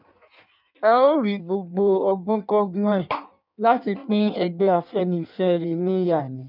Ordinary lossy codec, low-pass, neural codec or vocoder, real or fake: none; 5.4 kHz; codec, 16 kHz in and 24 kHz out, 1.1 kbps, FireRedTTS-2 codec; fake